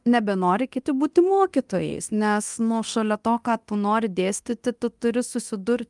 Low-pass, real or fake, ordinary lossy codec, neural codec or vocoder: 10.8 kHz; fake; Opus, 32 kbps; codec, 24 kHz, 0.9 kbps, DualCodec